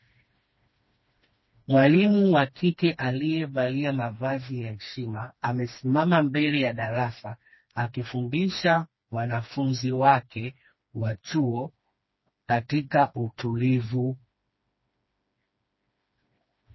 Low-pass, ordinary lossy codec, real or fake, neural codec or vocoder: 7.2 kHz; MP3, 24 kbps; fake; codec, 16 kHz, 2 kbps, FreqCodec, smaller model